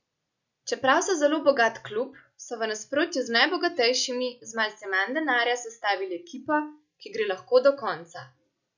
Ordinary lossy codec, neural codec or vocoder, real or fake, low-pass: none; none; real; 7.2 kHz